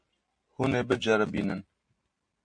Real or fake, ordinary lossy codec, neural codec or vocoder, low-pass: real; MP3, 48 kbps; none; 9.9 kHz